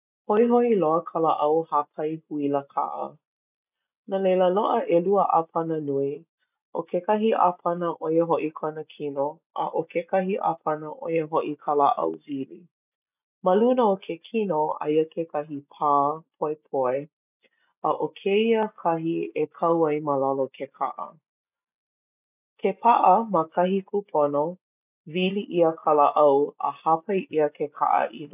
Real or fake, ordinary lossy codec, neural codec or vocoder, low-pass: real; none; none; 3.6 kHz